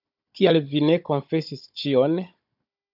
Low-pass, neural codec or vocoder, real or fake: 5.4 kHz; codec, 16 kHz, 16 kbps, FunCodec, trained on Chinese and English, 50 frames a second; fake